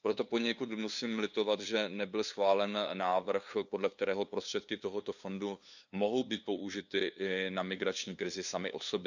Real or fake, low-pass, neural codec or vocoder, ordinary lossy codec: fake; 7.2 kHz; codec, 16 kHz, 2 kbps, FunCodec, trained on LibriTTS, 25 frames a second; none